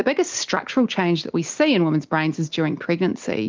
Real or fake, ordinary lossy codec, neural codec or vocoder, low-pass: real; Opus, 32 kbps; none; 7.2 kHz